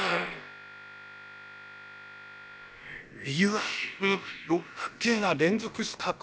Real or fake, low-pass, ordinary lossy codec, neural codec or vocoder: fake; none; none; codec, 16 kHz, about 1 kbps, DyCAST, with the encoder's durations